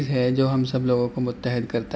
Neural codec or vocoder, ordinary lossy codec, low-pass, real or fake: none; none; none; real